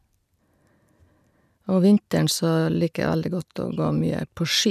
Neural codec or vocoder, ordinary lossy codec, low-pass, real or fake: none; none; 14.4 kHz; real